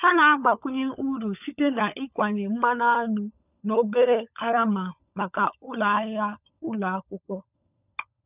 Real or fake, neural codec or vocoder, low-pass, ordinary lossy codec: fake; codec, 16 kHz, 16 kbps, FunCodec, trained on LibriTTS, 50 frames a second; 3.6 kHz; none